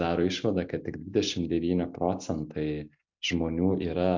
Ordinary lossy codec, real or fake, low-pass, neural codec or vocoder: MP3, 64 kbps; real; 7.2 kHz; none